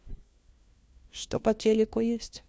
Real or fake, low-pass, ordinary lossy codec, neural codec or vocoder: fake; none; none; codec, 16 kHz, 1 kbps, FunCodec, trained on LibriTTS, 50 frames a second